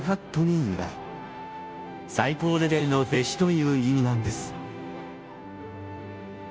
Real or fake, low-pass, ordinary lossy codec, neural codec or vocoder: fake; none; none; codec, 16 kHz, 0.5 kbps, FunCodec, trained on Chinese and English, 25 frames a second